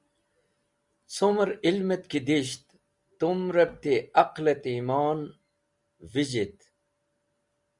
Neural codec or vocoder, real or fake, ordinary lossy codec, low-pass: none; real; Opus, 64 kbps; 10.8 kHz